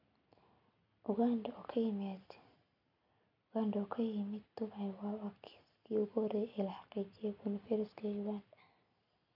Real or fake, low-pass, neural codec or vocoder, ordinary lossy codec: real; 5.4 kHz; none; none